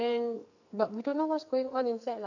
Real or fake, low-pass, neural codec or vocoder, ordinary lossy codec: fake; 7.2 kHz; codec, 44.1 kHz, 2.6 kbps, SNAC; none